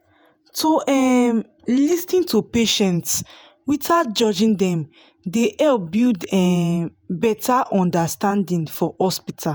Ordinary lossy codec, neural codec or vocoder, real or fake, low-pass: none; vocoder, 48 kHz, 128 mel bands, Vocos; fake; none